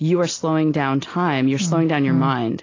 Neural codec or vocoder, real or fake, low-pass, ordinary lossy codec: none; real; 7.2 kHz; AAC, 32 kbps